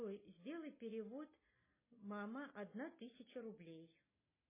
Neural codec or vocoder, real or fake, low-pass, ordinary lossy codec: none; real; 3.6 kHz; MP3, 16 kbps